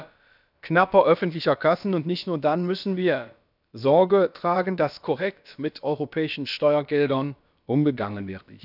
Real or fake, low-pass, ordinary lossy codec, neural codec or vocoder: fake; 5.4 kHz; none; codec, 16 kHz, about 1 kbps, DyCAST, with the encoder's durations